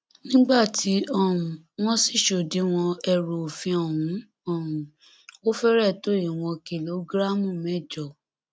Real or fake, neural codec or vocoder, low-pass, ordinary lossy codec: real; none; none; none